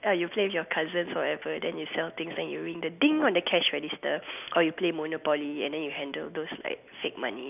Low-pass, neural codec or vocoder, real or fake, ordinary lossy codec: 3.6 kHz; none; real; none